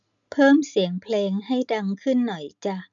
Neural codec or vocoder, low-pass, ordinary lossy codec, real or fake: none; 7.2 kHz; none; real